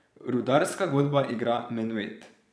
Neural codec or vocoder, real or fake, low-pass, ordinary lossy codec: vocoder, 22.05 kHz, 80 mel bands, Vocos; fake; none; none